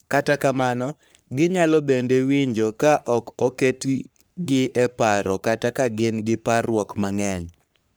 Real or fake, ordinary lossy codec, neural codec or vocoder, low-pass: fake; none; codec, 44.1 kHz, 3.4 kbps, Pupu-Codec; none